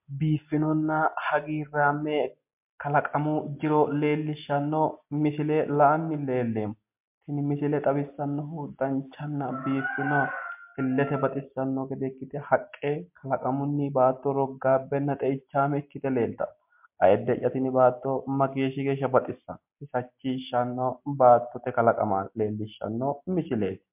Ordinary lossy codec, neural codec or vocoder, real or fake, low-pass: MP3, 32 kbps; none; real; 3.6 kHz